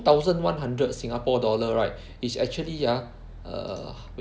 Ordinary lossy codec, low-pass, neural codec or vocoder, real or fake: none; none; none; real